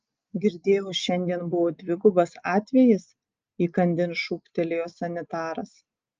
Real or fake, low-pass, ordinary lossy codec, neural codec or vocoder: real; 7.2 kHz; Opus, 24 kbps; none